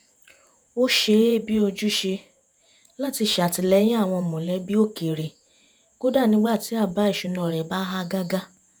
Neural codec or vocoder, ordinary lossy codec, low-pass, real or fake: vocoder, 48 kHz, 128 mel bands, Vocos; none; none; fake